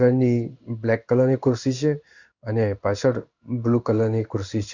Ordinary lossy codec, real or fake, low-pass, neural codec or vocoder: Opus, 64 kbps; fake; 7.2 kHz; codec, 16 kHz in and 24 kHz out, 1 kbps, XY-Tokenizer